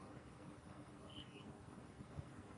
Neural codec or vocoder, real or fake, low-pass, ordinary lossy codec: codec, 44.1 kHz, 2.6 kbps, SNAC; fake; 10.8 kHz; MP3, 64 kbps